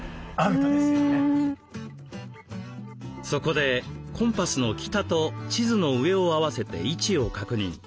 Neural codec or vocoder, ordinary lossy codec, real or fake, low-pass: none; none; real; none